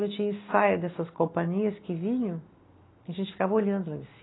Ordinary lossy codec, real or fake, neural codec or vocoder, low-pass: AAC, 16 kbps; real; none; 7.2 kHz